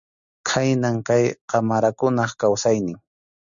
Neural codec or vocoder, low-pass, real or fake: none; 7.2 kHz; real